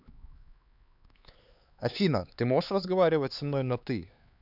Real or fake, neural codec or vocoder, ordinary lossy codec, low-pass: fake; codec, 16 kHz, 4 kbps, X-Codec, HuBERT features, trained on LibriSpeech; none; 5.4 kHz